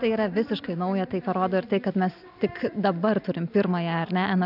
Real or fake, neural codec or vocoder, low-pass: real; none; 5.4 kHz